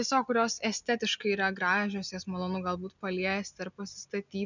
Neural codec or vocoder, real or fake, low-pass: none; real; 7.2 kHz